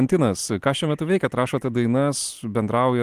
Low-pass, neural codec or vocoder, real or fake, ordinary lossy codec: 14.4 kHz; none; real; Opus, 24 kbps